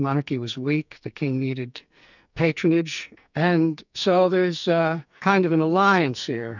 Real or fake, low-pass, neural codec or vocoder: fake; 7.2 kHz; codec, 44.1 kHz, 2.6 kbps, SNAC